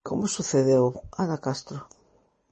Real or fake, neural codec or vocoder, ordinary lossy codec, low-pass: real; none; MP3, 32 kbps; 10.8 kHz